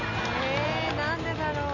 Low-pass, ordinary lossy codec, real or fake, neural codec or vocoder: 7.2 kHz; none; real; none